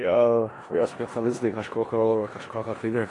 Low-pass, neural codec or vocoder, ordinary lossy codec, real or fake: 10.8 kHz; codec, 16 kHz in and 24 kHz out, 0.4 kbps, LongCat-Audio-Codec, four codebook decoder; AAC, 32 kbps; fake